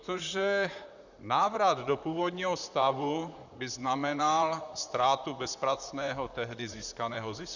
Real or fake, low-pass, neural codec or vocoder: fake; 7.2 kHz; vocoder, 44.1 kHz, 128 mel bands, Pupu-Vocoder